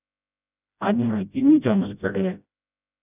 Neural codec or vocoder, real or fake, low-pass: codec, 16 kHz, 0.5 kbps, FreqCodec, smaller model; fake; 3.6 kHz